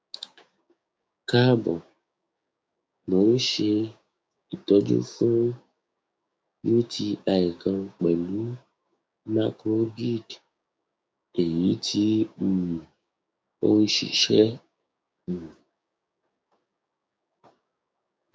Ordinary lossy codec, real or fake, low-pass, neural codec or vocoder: none; fake; none; codec, 16 kHz, 6 kbps, DAC